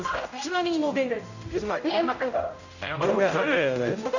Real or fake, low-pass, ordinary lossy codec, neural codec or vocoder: fake; 7.2 kHz; none; codec, 16 kHz, 0.5 kbps, X-Codec, HuBERT features, trained on general audio